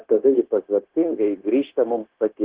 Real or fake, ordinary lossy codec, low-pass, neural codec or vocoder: fake; Opus, 16 kbps; 3.6 kHz; codec, 16 kHz, 0.9 kbps, LongCat-Audio-Codec